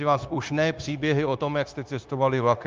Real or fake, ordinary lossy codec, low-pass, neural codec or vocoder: fake; Opus, 24 kbps; 7.2 kHz; codec, 16 kHz, 0.9 kbps, LongCat-Audio-Codec